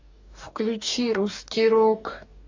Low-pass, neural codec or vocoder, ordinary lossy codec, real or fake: 7.2 kHz; codec, 44.1 kHz, 2.6 kbps, DAC; AAC, 48 kbps; fake